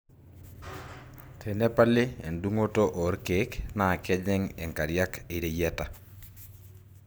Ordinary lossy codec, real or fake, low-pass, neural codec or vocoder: none; real; none; none